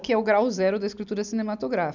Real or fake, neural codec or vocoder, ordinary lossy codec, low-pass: real; none; none; 7.2 kHz